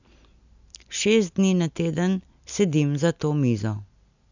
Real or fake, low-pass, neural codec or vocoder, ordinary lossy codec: real; 7.2 kHz; none; none